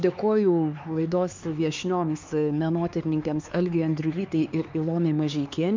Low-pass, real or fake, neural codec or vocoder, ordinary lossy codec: 7.2 kHz; fake; codec, 16 kHz, 4 kbps, X-Codec, HuBERT features, trained on LibriSpeech; MP3, 64 kbps